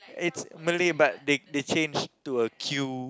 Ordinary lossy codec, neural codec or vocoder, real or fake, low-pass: none; none; real; none